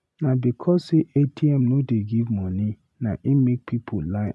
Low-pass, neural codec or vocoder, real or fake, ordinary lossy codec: none; none; real; none